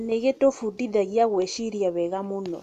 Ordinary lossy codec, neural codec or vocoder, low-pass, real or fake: none; none; 14.4 kHz; real